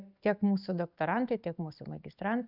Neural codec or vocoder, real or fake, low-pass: none; real; 5.4 kHz